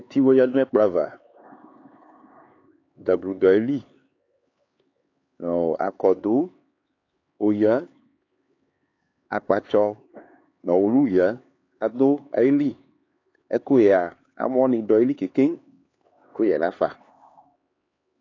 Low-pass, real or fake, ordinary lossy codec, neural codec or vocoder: 7.2 kHz; fake; AAC, 32 kbps; codec, 16 kHz, 4 kbps, X-Codec, HuBERT features, trained on LibriSpeech